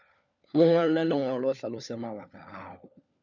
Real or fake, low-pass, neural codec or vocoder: fake; 7.2 kHz; codec, 16 kHz, 4 kbps, FunCodec, trained on LibriTTS, 50 frames a second